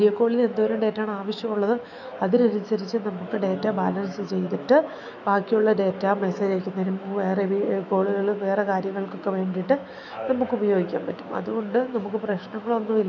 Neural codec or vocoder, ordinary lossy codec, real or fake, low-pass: autoencoder, 48 kHz, 128 numbers a frame, DAC-VAE, trained on Japanese speech; none; fake; 7.2 kHz